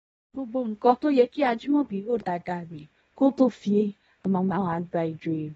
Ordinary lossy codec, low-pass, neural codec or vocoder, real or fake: AAC, 24 kbps; 10.8 kHz; codec, 24 kHz, 0.9 kbps, WavTokenizer, small release; fake